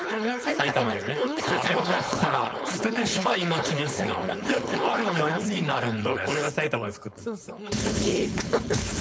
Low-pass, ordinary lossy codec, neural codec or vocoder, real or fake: none; none; codec, 16 kHz, 4.8 kbps, FACodec; fake